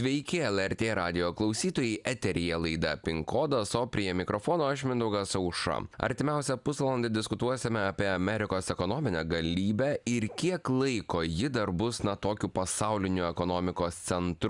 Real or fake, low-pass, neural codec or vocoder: real; 10.8 kHz; none